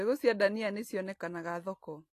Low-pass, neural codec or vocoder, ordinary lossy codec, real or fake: 14.4 kHz; none; AAC, 48 kbps; real